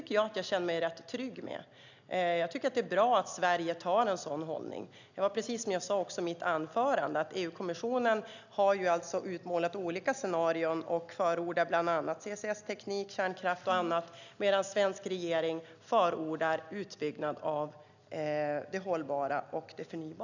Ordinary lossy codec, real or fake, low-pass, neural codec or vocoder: none; real; 7.2 kHz; none